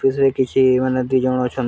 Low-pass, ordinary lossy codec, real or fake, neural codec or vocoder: none; none; real; none